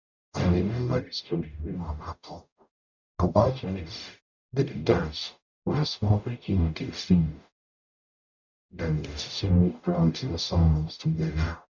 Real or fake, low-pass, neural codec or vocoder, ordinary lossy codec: fake; 7.2 kHz; codec, 44.1 kHz, 0.9 kbps, DAC; none